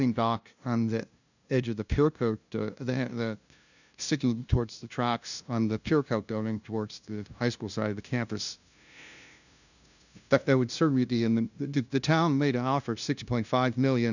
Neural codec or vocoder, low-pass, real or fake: codec, 16 kHz, 0.5 kbps, FunCodec, trained on LibriTTS, 25 frames a second; 7.2 kHz; fake